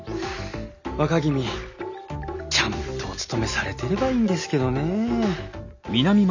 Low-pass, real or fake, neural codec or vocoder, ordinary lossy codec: 7.2 kHz; real; none; none